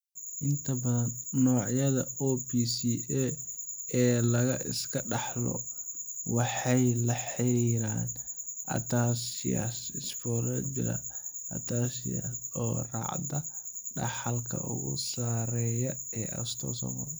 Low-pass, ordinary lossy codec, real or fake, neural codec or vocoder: none; none; real; none